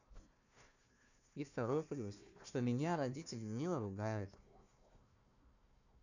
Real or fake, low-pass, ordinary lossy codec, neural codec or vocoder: fake; 7.2 kHz; none; codec, 16 kHz, 1 kbps, FunCodec, trained on Chinese and English, 50 frames a second